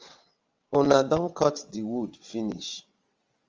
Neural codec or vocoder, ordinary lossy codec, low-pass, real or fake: vocoder, 22.05 kHz, 80 mel bands, WaveNeXt; Opus, 32 kbps; 7.2 kHz; fake